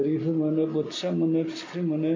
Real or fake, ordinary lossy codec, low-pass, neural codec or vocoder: real; MP3, 64 kbps; 7.2 kHz; none